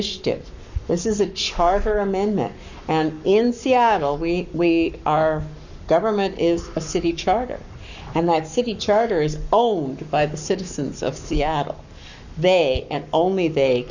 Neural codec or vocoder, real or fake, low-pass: codec, 44.1 kHz, 7.8 kbps, Pupu-Codec; fake; 7.2 kHz